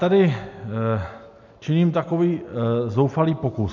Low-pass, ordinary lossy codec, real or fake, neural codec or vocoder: 7.2 kHz; MP3, 64 kbps; real; none